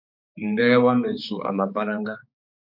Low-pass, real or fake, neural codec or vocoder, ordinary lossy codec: 5.4 kHz; fake; codec, 16 kHz, 4 kbps, X-Codec, HuBERT features, trained on balanced general audio; MP3, 48 kbps